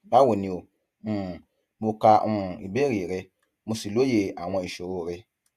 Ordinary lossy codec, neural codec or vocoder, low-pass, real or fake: Opus, 64 kbps; vocoder, 48 kHz, 128 mel bands, Vocos; 14.4 kHz; fake